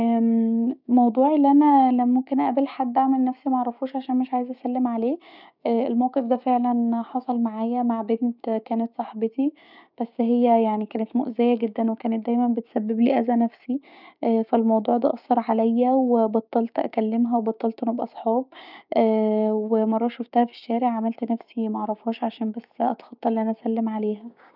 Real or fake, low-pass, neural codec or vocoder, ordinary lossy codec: fake; 5.4 kHz; codec, 16 kHz, 6 kbps, DAC; none